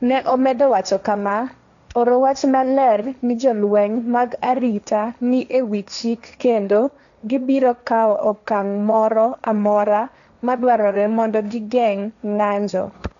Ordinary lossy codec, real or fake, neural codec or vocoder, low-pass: none; fake; codec, 16 kHz, 1.1 kbps, Voila-Tokenizer; 7.2 kHz